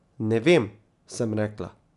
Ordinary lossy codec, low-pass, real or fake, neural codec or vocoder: none; 10.8 kHz; real; none